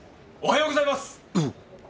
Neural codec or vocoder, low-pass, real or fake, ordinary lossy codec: none; none; real; none